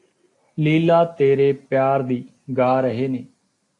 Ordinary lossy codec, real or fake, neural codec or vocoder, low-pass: AAC, 48 kbps; real; none; 10.8 kHz